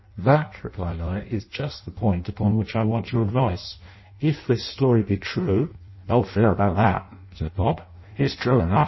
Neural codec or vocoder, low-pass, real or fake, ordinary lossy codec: codec, 16 kHz in and 24 kHz out, 0.6 kbps, FireRedTTS-2 codec; 7.2 kHz; fake; MP3, 24 kbps